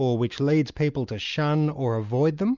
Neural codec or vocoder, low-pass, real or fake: none; 7.2 kHz; real